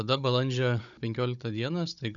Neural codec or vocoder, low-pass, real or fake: codec, 16 kHz, 16 kbps, FunCodec, trained on Chinese and English, 50 frames a second; 7.2 kHz; fake